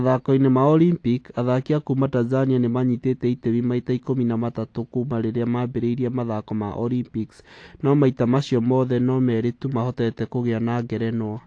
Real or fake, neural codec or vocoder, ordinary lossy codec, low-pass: real; none; AAC, 48 kbps; 9.9 kHz